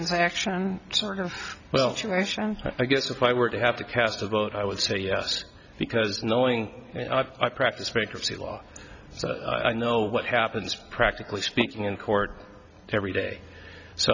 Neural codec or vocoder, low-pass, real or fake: none; 7.2 kHz; real